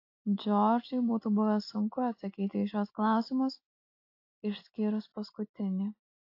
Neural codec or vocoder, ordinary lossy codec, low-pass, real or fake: none; AAC, 32 kbps; 5.4 kHz; real